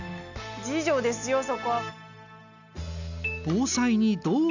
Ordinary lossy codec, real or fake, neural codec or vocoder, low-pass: none; real; none; 7.2 kHz